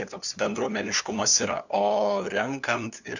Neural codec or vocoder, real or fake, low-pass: codec, 16 kHz, 2 kbps, FunCodec, trained on LibriTTS, 25 frames a second; fake; 7.2 kHz